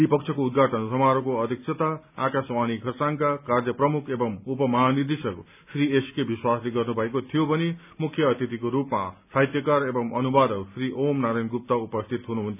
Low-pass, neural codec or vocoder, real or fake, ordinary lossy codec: 3.6 kHz; none; real; none